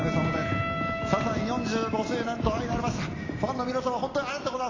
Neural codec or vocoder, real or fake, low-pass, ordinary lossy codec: none; real; 7.2 kHz; AAC, 32 kbps